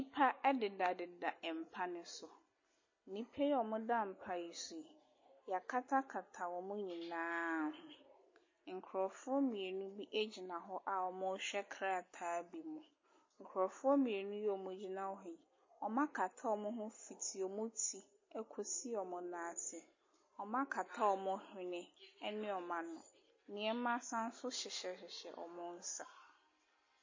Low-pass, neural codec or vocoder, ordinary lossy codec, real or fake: 7.2 kHz; none; MP3, 32 kbps; real